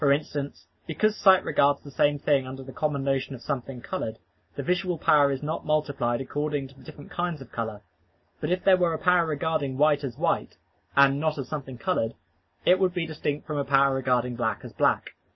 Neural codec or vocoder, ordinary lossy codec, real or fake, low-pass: none; MP3, 24 kbps; real; 7.2 kHz